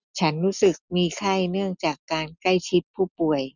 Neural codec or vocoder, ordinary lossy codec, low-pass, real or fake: none; none; 7.2 kHz; real